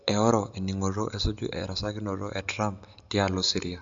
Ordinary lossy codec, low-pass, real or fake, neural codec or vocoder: AAC, 64 kbps; 7.2 kHz; real; none